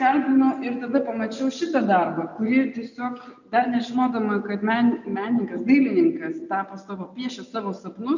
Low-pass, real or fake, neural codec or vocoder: 7.2 kHz; real; none